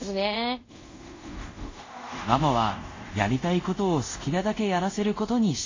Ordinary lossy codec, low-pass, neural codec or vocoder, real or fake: AAC, 32 kbps; 7.2 kHz; codec, 24 kHz, 0.5 kbps, DualCodec; fake